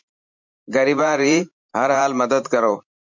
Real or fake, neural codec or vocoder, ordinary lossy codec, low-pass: fake; vocoder, 44.1 kHz, 128 mel bands every 512 samples, BigVGAN v2; MP3, 64 kbps; 7.2 kHz